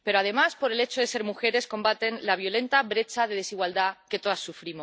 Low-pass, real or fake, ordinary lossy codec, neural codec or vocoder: none; real; none; none